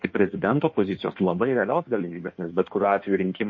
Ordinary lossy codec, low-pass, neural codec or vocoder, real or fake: MP3, 32 kbps; 7.2 kHz; codec, 16 kHz in and 24 kHz out, 1.1 kbps, FireRedTTS-2 codec; fake